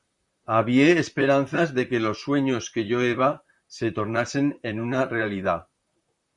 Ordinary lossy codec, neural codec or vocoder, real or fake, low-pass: Opus, 64 kbps; vocoder, 44.1 kHz, 128 mel bands, Pupu-Vocoder; fake; 10.8 kHz